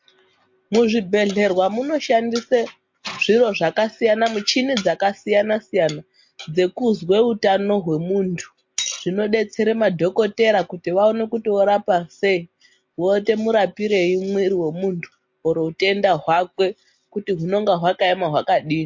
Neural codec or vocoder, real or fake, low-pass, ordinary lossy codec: none; real; 7.2 kHz; MP3, 48 kbps